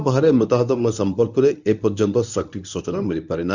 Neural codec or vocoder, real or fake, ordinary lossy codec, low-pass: codec, 24 kHz, 0.9 kbps, WavTokenizer, medium speech release version 1; fake; none; 7.2 kHz